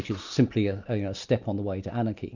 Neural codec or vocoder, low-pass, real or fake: none; 7.2 kHz; real